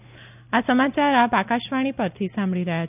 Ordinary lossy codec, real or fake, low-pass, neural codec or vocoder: none; real; 3.6 kHz; none